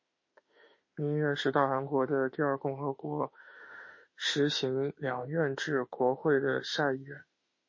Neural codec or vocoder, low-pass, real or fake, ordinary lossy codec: autoencoder, 48 kHz, 32 numbers a frame, DAC-VAE, trained on Japanese speech; 7.2 kHz; fake; MP3, 32 kbps